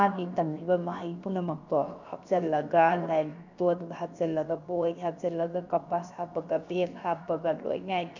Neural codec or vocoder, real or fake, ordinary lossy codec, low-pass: codec, 16 kHz, about 1 kbps, DyCAST, with the encoder's durations; fake; none; 7.2 kHz